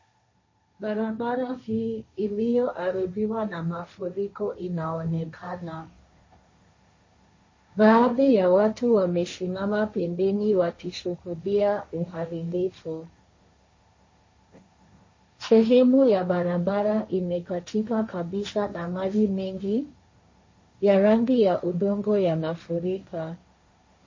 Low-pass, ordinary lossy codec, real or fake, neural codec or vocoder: 7.2 kHz; MP3, 32 kbps; fake; codec, 16 kHz, 1.1 kbps, Voila-Tokenizer